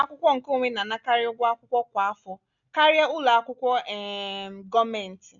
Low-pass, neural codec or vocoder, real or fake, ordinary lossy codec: 7.2 kHz; none; real; none